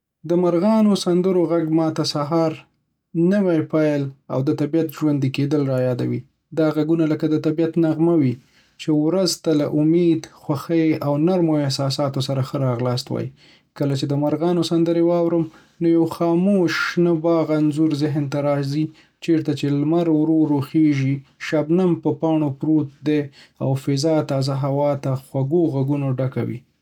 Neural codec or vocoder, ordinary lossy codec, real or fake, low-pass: none; none; real; 19.8 kHz